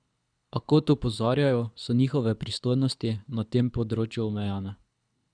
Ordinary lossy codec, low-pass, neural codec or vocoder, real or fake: none; 9.9 kHz; codec, 24 kHz, 6 kbps, HILCodec; fake